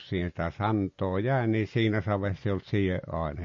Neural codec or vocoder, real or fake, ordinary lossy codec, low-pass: none; real; MP3, 32 kbps; 7.2 kHz